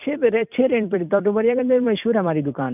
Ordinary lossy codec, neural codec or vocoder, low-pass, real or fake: none; vocoder, 44.1 kHz, 128 mel bands every 256 samples, BigVGAN v2; 3.6 kHz; fake